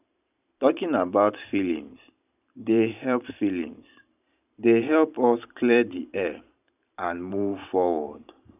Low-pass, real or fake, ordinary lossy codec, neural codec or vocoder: 3.6 kHz; fake; none; vocoder, 44.1 kHz, 128 mel bands every 512 samples, BigVGAN v2